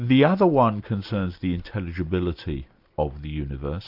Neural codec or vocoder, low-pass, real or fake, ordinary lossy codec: none; 5.4 kHz; real; AAC, 48 kbps